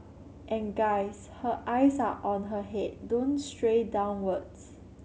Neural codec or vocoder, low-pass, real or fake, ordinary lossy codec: none; none; real; none